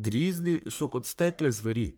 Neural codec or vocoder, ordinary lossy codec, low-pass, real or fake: codec, 44.1 kHz, 1.7 kbps, Pupu-Codec; none; none; fake